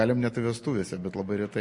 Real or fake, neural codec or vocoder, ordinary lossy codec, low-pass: real; none; MP3, 48 kbps; 10.8 kHz